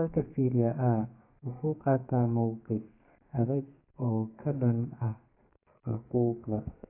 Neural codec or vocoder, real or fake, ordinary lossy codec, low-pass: codec, 32 kHz, 1.9 kbps, SNAC; fake; AAC, 24 kbps; 3.6 kHz